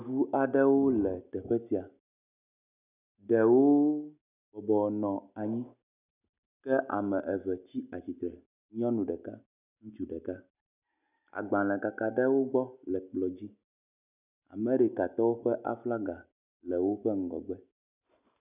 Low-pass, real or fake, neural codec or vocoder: 3.6 kHz; real; none